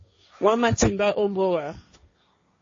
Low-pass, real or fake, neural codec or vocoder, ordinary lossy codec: 7.2 kHz; fake; codec, 16 kHz, 1.1 kbps, Voila-Tokenizer; MP3, 32 kbps